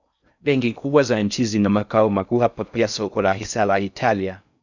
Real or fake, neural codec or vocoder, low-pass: fake; codec, 16 kHz in and 24 kHz out, 0.6 kbps, FocalCodec, streaming, 4096 codes; 7.2 kHz